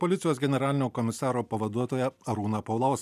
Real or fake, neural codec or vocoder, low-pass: real; none; 14.4 kHz